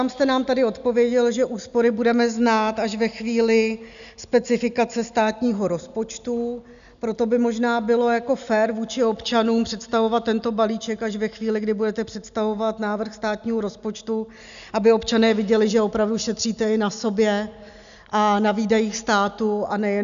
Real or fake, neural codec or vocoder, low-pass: real; none; 7.2 kHz